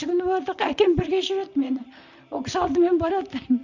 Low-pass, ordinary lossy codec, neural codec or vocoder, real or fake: 7.2 kHz; none; vocoder, 44.1 kHz, 128 mel bands, Pupu-Vocoder; fake